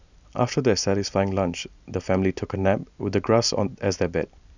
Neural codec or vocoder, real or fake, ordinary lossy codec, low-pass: none; real; none; 7.2 kHz